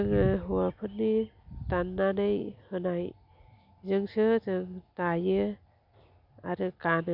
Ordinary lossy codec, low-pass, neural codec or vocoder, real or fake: none; 5.4 kHz; none; real